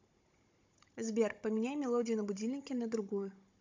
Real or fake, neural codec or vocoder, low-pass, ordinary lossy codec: fake; codec, 16 kHz, 16 kbps, FunCodec, trained on Chinese and English, 50 frames a second; 7.2 kHz; MP3, 64 kbps